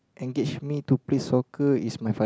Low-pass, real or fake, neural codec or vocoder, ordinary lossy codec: none; real; none; none